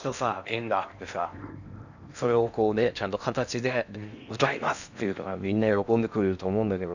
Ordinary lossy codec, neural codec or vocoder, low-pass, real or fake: none; codec, 16 kHz in and 24 kHz out, 0.8 kbps, FocalCodec, streaming, 65536 codes; 7.2 kHz; fake